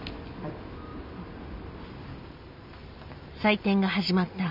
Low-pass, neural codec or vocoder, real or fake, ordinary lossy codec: 5.4 kHz; none; real; none